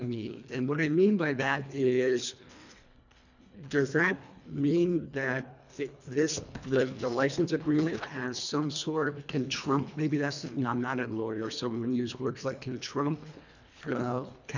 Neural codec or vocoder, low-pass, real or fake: codec, 24 kHz, 1.5 kbps, HILCodec; 7.2 kHz; fake